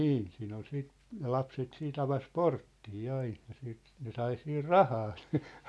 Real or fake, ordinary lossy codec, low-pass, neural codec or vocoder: real; none; none; none